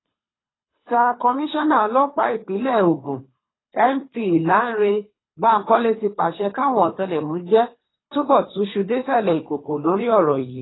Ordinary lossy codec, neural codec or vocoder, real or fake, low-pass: AAC, 16 kbps; codec, 24 kHz, 3 kbps, HILCodec; fake; 7.2 kHz